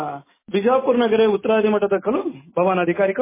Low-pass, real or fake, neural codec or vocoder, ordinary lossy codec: 3.6 kHz; real; none; MP3, 16 kbps